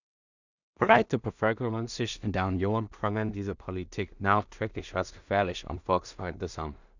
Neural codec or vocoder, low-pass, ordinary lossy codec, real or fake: codec, 16 kHz in and 24 kHz out, 0.4 kbps, LongCat-Audio-Codec, two codebook decoder; 7.2 kHz; none; fake